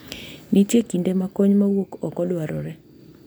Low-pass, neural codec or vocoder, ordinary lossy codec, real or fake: none; none; none; real